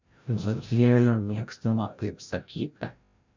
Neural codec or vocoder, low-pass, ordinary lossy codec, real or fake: codec, 16 kHz, 0.5 kbps, FreqCodec, larger model; 7.2 kHz; MP3, 64 kbps; fake